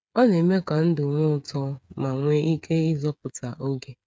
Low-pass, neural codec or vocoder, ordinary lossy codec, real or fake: none; codec, 16 kHz, 8 kbps, FreqCodec, smaller model; none; fake